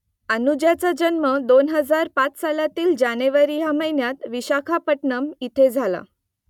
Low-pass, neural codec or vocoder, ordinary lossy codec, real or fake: 19.8 kHz; none; none; real